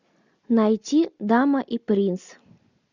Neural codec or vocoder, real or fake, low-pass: none; real; 7.2 kHz